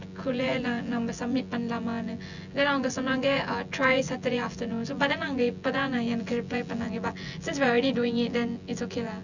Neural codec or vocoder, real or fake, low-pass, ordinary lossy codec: vocoder, 24 kHz, 100 mel bands, Vocos; fake; 7.2 kHz; none